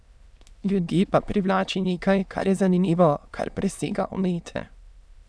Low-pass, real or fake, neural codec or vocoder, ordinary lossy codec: none; fake; autoencoder, 22.05 kHz, a latent of 192 numbers a frame, VITS, trained on many speakers; none